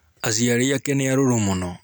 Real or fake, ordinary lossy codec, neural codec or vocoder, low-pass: real; none; none; none